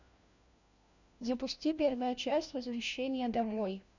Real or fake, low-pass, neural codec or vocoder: fake; 7.2 kHz; codec, 16 kHz, 1 kbps, FunCodec, trained on LibriTTS, 50 frames a second